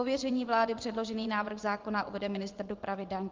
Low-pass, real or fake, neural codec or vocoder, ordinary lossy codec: 7.2 kHz; fake; vocoder, 24 kHz, 100 mel bands, Vocos; Opus, 32 kbps